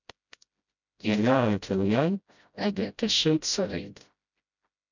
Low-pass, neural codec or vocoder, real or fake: 7.2 kHz; codec, 16 kHz, 0.5 kbps, FreqCodec, smaller model; fake